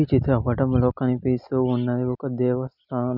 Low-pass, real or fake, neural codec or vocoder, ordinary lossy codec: 5.4 kHz; real; none; none